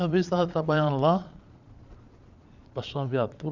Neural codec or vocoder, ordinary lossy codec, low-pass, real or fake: vocoder, 22.05 kHz, 80 mel bands, Vocos; none; 7.2 kHz; fake